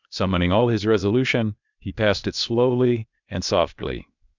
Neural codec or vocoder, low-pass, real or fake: codec, 16 kHz, 0.8 kbps, ZipCodec; 7.2 kHz; fake